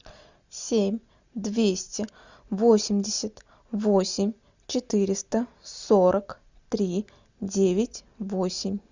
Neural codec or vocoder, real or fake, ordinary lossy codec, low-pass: none; real; Opus, 64 kbps; 7.2 kHz